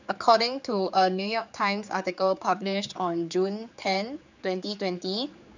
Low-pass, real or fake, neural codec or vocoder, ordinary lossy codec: 7.2 kHz; fake; codec, 16 kHz, 4 kbps, X-Codec, HuBERT features, trained on general audio; none